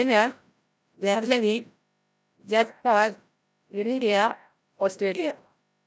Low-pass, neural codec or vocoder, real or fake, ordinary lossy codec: none; codec, 16 kHz, 0.5 kbps, FreqCodec, larger model; fake; none